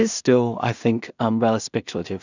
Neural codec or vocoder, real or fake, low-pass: codec, 16 kHz in and 24 kHz out, 0.4 kbps, LongCat-Audio-Codec, two codebook decoder; fake; 7.2 kHz